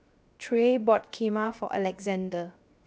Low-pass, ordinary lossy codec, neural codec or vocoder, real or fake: none; none; codec, 16 kHz, 0.7 kbps, FocalCodec; fake